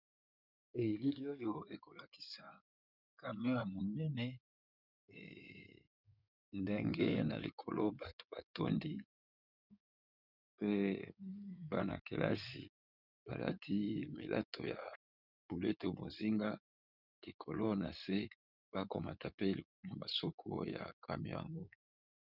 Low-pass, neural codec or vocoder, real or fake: 5.4 kHz; codec, 16 kHz in and 24 kHz out, 2.2 kbps, FireRedTTS-2 codec; fake